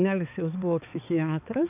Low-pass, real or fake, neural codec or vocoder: 3.6 kHz; fake; codec, 16 kHz, 4 kbps, X-Codec, HuBERT features, trained on balanced general audio